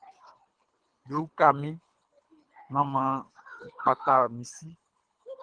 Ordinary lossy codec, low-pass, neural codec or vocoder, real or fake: Opus, 24 kbps; 9.9 kHz; codec, 24 kHz, 3 kbps, HILCodec; fake